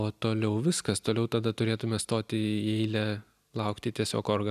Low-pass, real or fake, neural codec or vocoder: 14.4 kHz; real; none